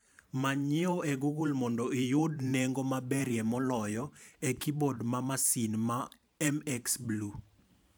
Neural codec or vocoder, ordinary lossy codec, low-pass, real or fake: vocoder, 44.1 kHz, 128 mel bands every 512 samples, BigVGAN v2; none; none; fake